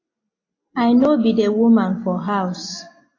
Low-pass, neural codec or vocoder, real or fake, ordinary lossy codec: 7.2 kHz; none; real; AAC, 32 kbps